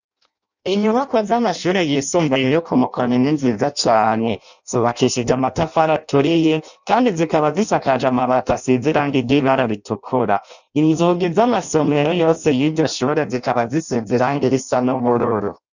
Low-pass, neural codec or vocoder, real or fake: 7.2 kHz; codec, 16 kHz in and 24 kHz out, 0.6 kbps, FireRedTTS-2 codec; fake